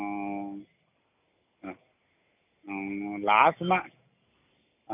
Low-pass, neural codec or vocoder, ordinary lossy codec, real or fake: 3.6 kHz; none; none; real